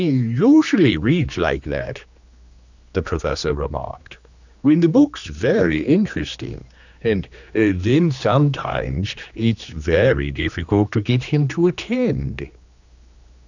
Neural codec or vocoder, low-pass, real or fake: codec, 16 kHz, 2 kbps, X-Codec, HuBERT features, trained on general audio; 7.2 kHz; fake